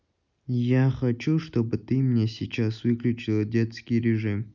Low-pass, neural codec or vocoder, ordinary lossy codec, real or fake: 7.2 kHz; none; none; real